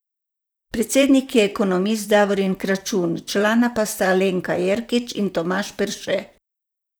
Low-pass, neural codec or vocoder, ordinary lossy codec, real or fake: none; vocoder, 44.1 kHz, 128 mel bands, Pupu-Vocoder; none; fake